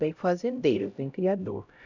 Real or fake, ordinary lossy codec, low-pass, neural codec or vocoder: fake; none; 7.2 kHz; codec, 16 kHz, 0.5 kbps, X-Codec, HuBERT features, trained on LibriSpeech